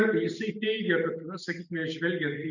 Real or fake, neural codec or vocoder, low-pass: real; none; 7.2 kHz